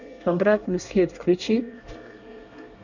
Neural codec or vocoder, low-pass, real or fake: codec, 24 kHz, 1 kbps, SNAC; 7.2 kHz; fake